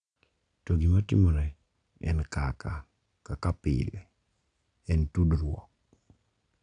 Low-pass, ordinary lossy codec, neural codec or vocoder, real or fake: 9.9 kHz; none; none; real